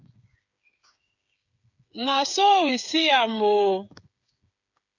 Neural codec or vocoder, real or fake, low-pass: codec, 16 kHz, 8 kbps, FreqCodec, smaller model; fake; 7.2 kHz